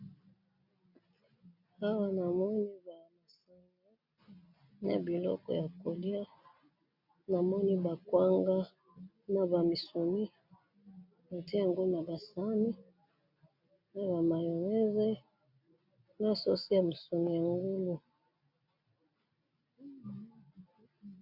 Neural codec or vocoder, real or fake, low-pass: none; real; 5.4 kHz